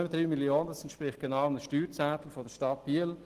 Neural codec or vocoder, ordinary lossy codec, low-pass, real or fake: none; Opus, 16 kbps; 14.4 kHz; real